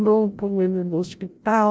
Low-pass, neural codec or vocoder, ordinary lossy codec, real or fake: none; codec, 16 kHz, 0.5 kbps, FreqCodec, larger model; none; fake